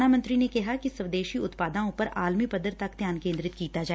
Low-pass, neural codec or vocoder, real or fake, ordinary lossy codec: none; none; real; none